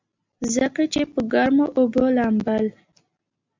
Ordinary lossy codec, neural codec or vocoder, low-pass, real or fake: MP3, 64 kbps; none; 7.2 kHz; real